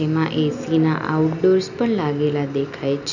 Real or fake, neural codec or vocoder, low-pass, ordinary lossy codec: real; none; 7.2 kHz; none